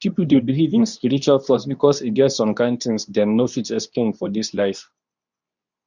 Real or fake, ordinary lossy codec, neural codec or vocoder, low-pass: fake; none; codec, 24 kHz, 0.9 kbps, WavTokenizer, medium speech release version 2; 7.2 kHz